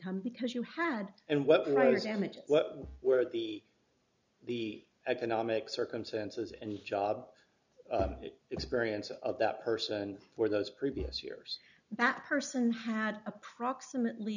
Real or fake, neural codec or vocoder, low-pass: real; none; 7.2 kHz